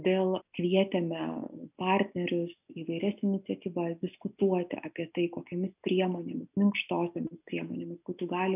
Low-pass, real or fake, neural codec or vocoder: 3.6 kHz; real; none